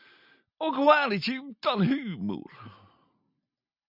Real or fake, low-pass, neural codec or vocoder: real; 5.4 kHz; none